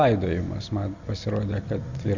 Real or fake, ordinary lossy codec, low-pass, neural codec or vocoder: real; Opus, 64 kbps; 7.2 kHz; none